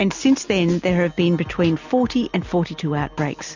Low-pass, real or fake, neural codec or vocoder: 7.2 kHz; real; none